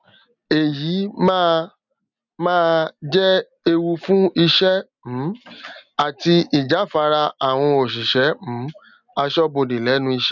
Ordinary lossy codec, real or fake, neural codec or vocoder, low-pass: none; real; none; 7.2 kHz